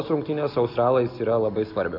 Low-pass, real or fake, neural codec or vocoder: 5.4 kHz; real; none